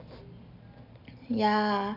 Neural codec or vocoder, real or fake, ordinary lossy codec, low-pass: none; real; none; 5.4 kHz